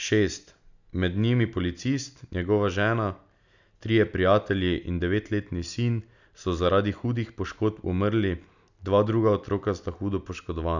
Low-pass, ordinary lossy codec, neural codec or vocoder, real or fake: 7.2 kHz; none; none; real